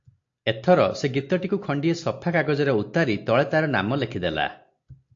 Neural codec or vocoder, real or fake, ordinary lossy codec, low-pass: none; real; AAC, 48 kbps; 7.2 kHz